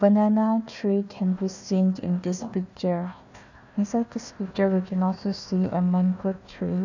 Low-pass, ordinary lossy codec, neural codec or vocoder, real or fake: 7.2 kHz; none; codec, 16 kHz, 1 kbps, FunCodec, trained on Chinese and English, 50 frames a second; fake